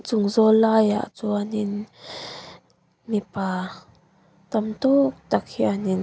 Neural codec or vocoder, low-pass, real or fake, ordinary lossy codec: none; none; real; none